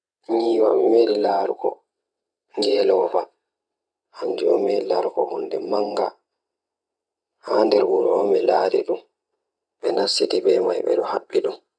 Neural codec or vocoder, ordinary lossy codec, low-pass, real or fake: vocoder, 22.05 kHz, 80 mel bands, WaveNeXt; none; 9.9 kHz; fake